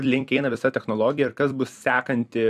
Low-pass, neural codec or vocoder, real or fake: 14.4 kHz; vocoder, 44.1 kHz, 128 mel bands every 256 samples, BigVGAN v2; fake